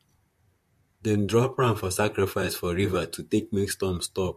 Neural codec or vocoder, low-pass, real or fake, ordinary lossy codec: vocoder, 44.1 kHz, 128 mel bands, Pupu-Vocoder; 14.4 kHz; fake; MP3, 64 kbps